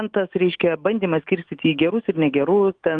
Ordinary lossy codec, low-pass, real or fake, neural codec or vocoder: Opus, 24 kbps; 9.9 kHz; fake; autoencoder, 48 kHz, 128 numbers a frame, DAC-VAE, trained on Japanese speech